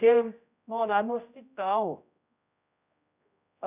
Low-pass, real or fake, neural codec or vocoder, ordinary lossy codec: 3.6 kHz; fake; codec, 16 kHz, 0.5 kbps, X-Codec, HuBERT features, trained on general audio; none